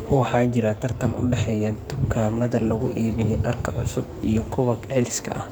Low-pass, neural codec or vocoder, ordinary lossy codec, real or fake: none; codec, 44.1 kHz, 2.6 kbps, SNAC; none; fake